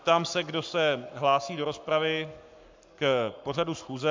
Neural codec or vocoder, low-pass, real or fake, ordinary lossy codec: codec, 44.1 kHz, 7.8 kbps, Pupu-Codec; 7.2 kHz; fake; MP3, 64 kbps